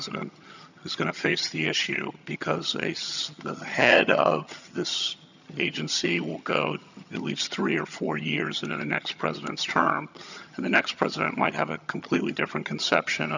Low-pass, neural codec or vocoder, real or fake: 7.2 kHz; vocoder, 22.05 kHz, 80 mel bands, HiFi-GAN; fake